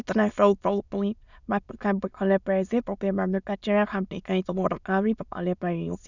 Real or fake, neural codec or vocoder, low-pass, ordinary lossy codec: fake; autoencoder, 22.05 kHz, a latent of 192 numbers a frame, VITS, trained on many speakers; 7.2 kHz; none